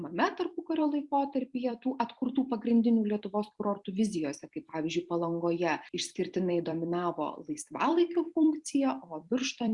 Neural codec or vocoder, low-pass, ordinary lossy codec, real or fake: vocoder, 44.1 kHz, 128 mel bands every 256 samples, BigVGAN v2; 10.8 kHz; Opus, 64 kbps; fake